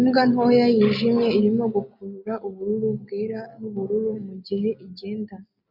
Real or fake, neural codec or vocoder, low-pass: real; none; 5.4 kHz